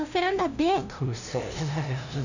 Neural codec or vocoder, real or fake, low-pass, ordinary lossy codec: codec, 16 kHz, 0.5 kbps, FunCodec, trained on LibriTTS, 25 frames a second; fake; 7.2 kHz; none